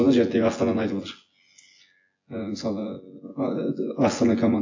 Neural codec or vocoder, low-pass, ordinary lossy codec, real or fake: vocoder, 24 kHz, 100 mel bands, Vocos; 7.2 kHz; AAC, 48 kbps; fake